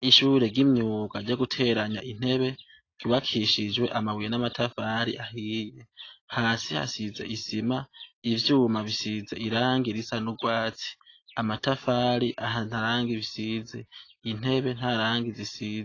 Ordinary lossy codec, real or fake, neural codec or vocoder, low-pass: AAC, 32 kbps; real; none; 7.2 kHz